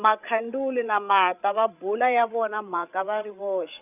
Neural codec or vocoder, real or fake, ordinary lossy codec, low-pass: codec, 16 kHz, 8 kbps, FreqCodec, larger model; fake; none; 3.6 kHz